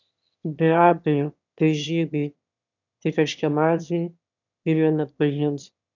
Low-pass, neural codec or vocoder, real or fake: 7.2 kHz; autoencoder, 22.05 kHz, a latent of 192 numbers a frame, VITS, trained on one speaker; fake